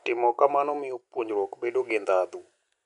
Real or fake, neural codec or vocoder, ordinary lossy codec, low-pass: real; none; none; 10.8 kHz